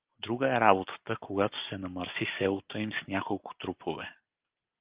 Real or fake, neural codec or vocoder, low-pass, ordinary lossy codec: real; none; 3.6 kHz; Opus, 32 kbps